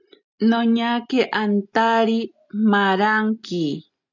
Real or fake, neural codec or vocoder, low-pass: real; none; 7.2 kHz